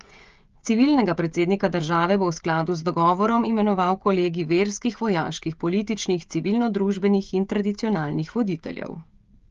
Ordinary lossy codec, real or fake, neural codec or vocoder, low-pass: Opus, 32 kbps; fake; codec, 16 kHz, 16 kbps, FreqCodec, smaller model; 7.2 kHz